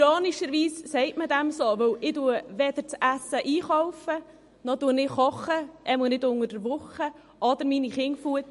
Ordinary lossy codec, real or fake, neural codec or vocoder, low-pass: MP3, 48 kbps; real; none; 14.4 kHz